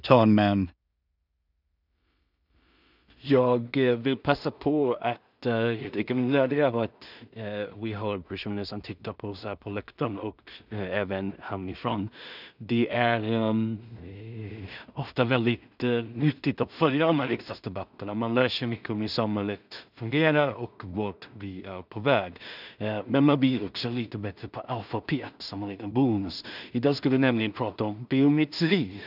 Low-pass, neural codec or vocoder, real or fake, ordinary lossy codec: 5.4 kHz; codec, 16 kHz in and 24 kHz out, 0.4 kbps, LongCat-Audio-Codec, two codebook decoder; fake; none